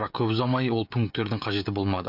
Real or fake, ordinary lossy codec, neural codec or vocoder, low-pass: fake; none; codec, 16 kHz, 16 kbps, FreqCodec, smaller model; 5.4 kHz